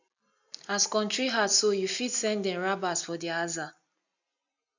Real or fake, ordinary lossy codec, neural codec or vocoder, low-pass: real; none; none; 7.2 kHz